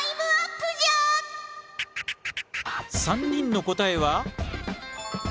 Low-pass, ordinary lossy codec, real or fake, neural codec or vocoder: none; none; real; none